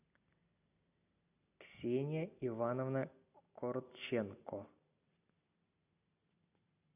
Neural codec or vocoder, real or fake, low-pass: none; real; 3.6 kHz